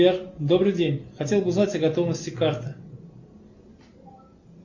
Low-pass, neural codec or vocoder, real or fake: 7.2 kHz; none; real